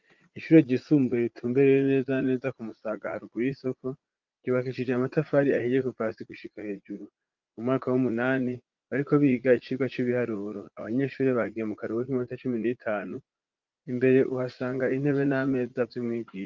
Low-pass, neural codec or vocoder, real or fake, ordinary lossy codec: 7.2 kHz; vocoder, 44.1 kHz, 80 mel bands, Vocos; fake; Opus, 24 kbps